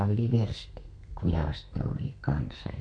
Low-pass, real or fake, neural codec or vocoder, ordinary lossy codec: 9.9 kHz; fake; codec, 32 kHz, 1.9 kbps, SNAC; none